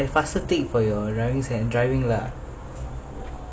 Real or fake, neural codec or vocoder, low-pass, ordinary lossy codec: real; none; none; none